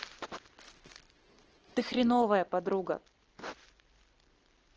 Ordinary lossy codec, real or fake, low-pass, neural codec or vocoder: Opus, 16 kbps; real; 7.2 kHz; none